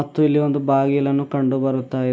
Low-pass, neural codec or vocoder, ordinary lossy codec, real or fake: none; none; none; real